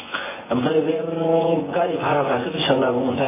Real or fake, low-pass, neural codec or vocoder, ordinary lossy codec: fake; 3.6 kHz; vocoder, 24 kHz, 100 mel bands, Vocos; MP3, 16 kbps